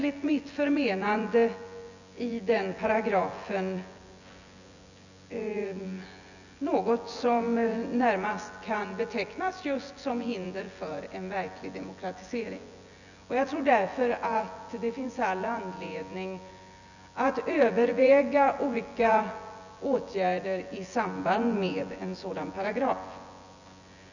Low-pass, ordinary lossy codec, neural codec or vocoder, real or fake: 7.2 kHz; none; vocoder, 24 kHz, 100 mel bands, Vocos; fake